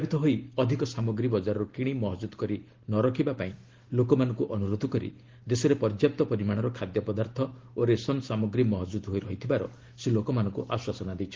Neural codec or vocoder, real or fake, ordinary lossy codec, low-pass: none; real; Opus, 16 kbps; 7.2 kHz